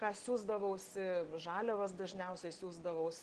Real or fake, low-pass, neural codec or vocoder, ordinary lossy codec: real; 9.9 kHz; none; Opus, 16 kbps